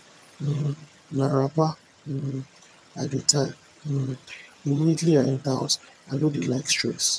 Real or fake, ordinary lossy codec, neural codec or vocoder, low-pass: fake; none; vocoder, 22.05 kHz, 80 mel bands, HiFi-GAN; none